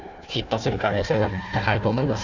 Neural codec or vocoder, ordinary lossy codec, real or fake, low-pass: codec, 16 kHz, 1 kbps, FunCodec, trained on Chinese and English, 50 frames a second; none; fake; 7.2 kHz